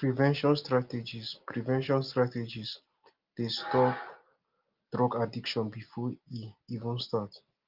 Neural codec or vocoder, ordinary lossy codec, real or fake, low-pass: none; Opus, 32 kbps; real; 5.4 kHz